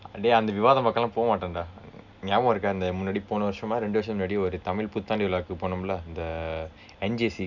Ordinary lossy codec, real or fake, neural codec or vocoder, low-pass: none; real; none; 7.2 kHz